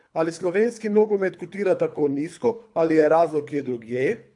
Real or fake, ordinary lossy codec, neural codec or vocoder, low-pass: fake; AAC, 64 kbps; codec, 24 kHz, 3 kbps, HILCodec; 10.8 kHz